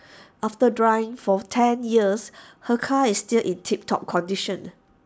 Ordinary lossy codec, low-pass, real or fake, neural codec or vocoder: none; none; real; none